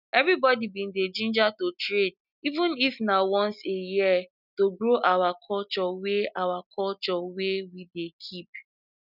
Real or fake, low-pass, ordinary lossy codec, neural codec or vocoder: real; 5.4 kHz; none; none